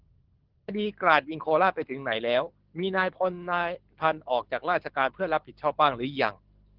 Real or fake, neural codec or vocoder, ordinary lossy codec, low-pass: fake; codec, 24 kHz, 6 kbps, HILCodec; Opus, 16 kbps; 5.4 kHz